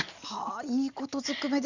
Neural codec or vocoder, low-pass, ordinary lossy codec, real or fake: none; 7.2 kHz; Opus, 64 kbps; real